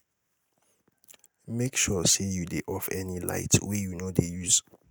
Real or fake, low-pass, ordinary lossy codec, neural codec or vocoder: real; none; none; none